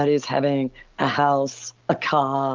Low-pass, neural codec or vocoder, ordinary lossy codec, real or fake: 7.2 kHz; none; Opus, 32 kbps; real